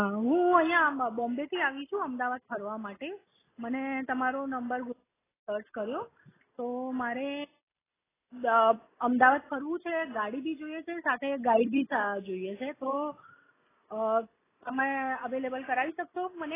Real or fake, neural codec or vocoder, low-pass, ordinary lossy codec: real; none; 3.6 kHz; AAC, 16 kbps